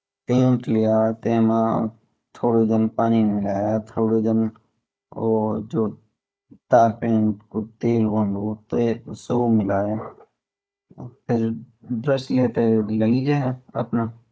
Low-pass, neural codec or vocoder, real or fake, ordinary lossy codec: none; codec, 16 kHz, 4 kbps, FunCodec, trained on Chinese and English, 50 frames a second; fake; none